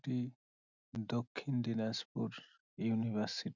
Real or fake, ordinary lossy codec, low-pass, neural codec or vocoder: real; none; 7.2 kHz; none